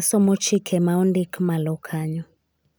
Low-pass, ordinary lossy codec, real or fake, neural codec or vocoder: none; none; real; none